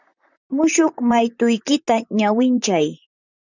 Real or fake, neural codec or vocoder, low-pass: fake; vocoder, 44.1 kHz, 128 mel bands, Pupu-Vocoder; 7.2 kHz